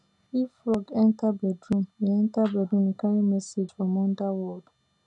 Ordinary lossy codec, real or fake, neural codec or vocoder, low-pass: none; real; none; 10.8 kHz